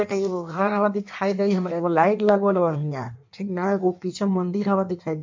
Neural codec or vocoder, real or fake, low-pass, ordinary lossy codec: codec, 16 kHz in and 24 kHz out, 1.1 kbps, FireRedTTS-2 codec; fake; 7.2 kHz; MP3, 48 kbps